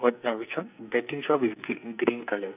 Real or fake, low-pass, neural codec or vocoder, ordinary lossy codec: fake; 3.6 kHz; codec, 44.1 kHz, 2.6 kbps, SNAC; none